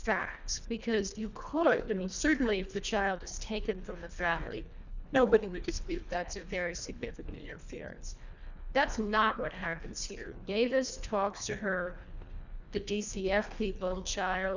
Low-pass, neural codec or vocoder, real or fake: 7.2 kHz; codec, 24 kHz, 1.5 kbps, HILCodec; fake